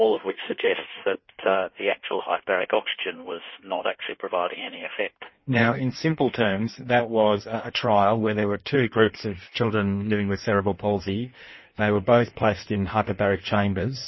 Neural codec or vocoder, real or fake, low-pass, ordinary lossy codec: codec, 16 kHz in and 24 kHz out, 1.1 kbps, FireRedTTS-2 codec; fake; 7.2 kHz; MP3, 24 kbps